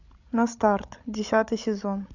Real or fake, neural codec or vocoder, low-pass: fake; codec, 16 kHz, 16 kbps, FreqCodec, larger model; 7.2 kHz